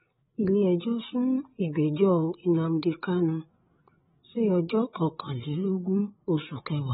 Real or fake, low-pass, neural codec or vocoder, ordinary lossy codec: fake; 7.2 kHz; codec, 16 kHz, 8 kbps, FreqCodec, larger model; AAC, 16 kbps